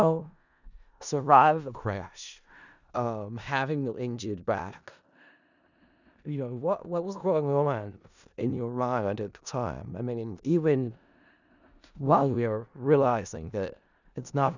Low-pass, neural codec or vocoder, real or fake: 7.2 kHz; codec, 16 kHz in and 24 kHz out, 0.4 kbps, LongCat-Audio-Codec, four codebook decoder; fake